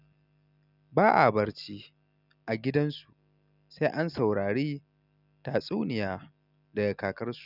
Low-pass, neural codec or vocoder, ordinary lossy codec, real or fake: 5.4 kHz; none; none; real